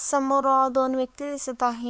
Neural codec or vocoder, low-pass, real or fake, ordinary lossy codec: codec, 16 kHz, 4 kbps, X-Codec, WavLM features, trained on Multilingual LibriSpeech; none; fake; none